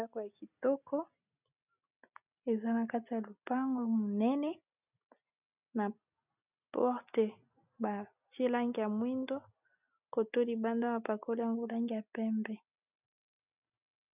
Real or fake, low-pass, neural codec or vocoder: real; 3.6 kHz; none